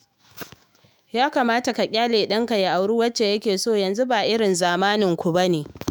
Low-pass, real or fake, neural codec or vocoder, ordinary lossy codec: none; fake; autoencoder, 48 kHz, 128 numbers a frame, DAC-VAE, trained on Japanese speech; none